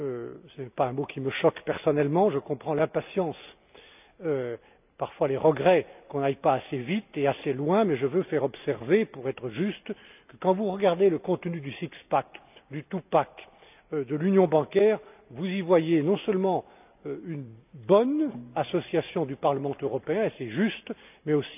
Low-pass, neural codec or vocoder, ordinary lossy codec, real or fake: 3.6 kHz; none; none; real